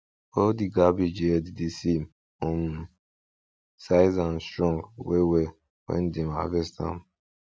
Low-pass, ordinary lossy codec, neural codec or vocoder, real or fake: none; none; none; real